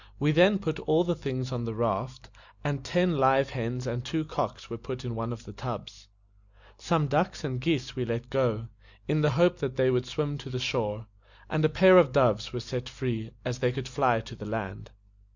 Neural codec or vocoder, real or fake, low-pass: none; real; 7.2 kHz